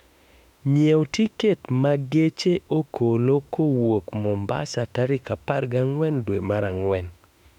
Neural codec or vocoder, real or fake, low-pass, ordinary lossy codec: autoencoder, 48 kHz, 32 numbers a frame, DAC-VAE, trained on Japanese speech; fake; 19.8 kHz; none